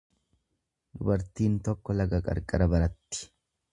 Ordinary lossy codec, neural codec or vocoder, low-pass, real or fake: MP3, 64 kbps; none; 10.8 kHz; real